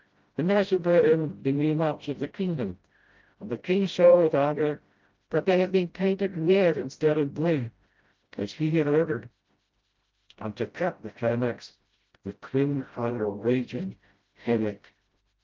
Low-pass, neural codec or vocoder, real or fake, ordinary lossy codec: 7.2 kHz; codec, 16 kHz, 0.5 kbps, FreqCodec, smaller model; fake; Opus, 24 kbps